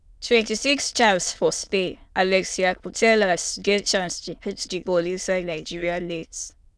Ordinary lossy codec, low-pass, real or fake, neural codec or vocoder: none; none; fake; autoencoder, 22.05 kHz, a latent of 192 numbers a frame, VITS, trained on many speakers